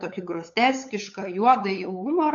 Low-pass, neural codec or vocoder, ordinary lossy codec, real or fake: 7.2 kHz; codec, 16 kHz, 8 kbps, FunCodec, trained on LibriTTS, 25 frames a second; AAC, 48 kbps; fake